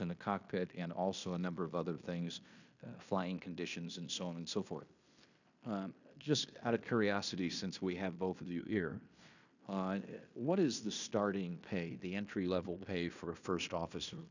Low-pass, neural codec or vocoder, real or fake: 7.2 kHz; codec, 16 kHz in and 24 kHz out, 0.9 kbps, LongCat-Audio-Codec, fine tuned four codebook decoder; fake